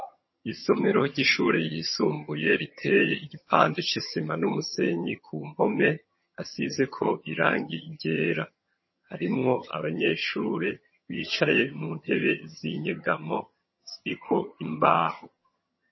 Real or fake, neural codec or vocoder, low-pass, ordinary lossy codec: fake; vocoder, 22.05 kHz, 80 mel bands, HiFi-GAN; 7.2 kHz; MP3, 24 kbps